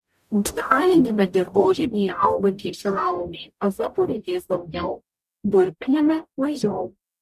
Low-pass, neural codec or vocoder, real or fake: 14.4 kHz; codec, 44.1 kHz, 0.9 kbps, DAC; fake